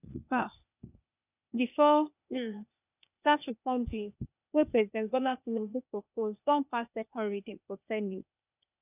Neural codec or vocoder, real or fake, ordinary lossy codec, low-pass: codec, 16 kHz, 0.8 kbps, ZipCodec; fake; none; 3.6 kHz